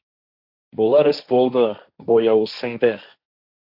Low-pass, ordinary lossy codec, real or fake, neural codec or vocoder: 5.4 kHz; AAC, 48 kbps; fake; codec, 16 kHz, 1.1 kbps, Voila-Tokenizer